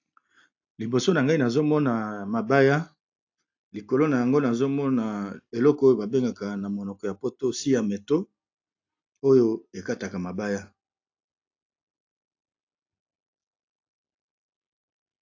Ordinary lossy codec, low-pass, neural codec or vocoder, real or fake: AAC, 48 kbps; 7.2 kHz; none; real